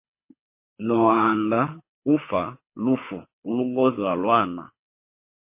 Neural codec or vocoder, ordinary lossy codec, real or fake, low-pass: codec, 24 kHz, 6 kbps, HILCodec; MP3, 24 kbps; fake; 3.6 kHz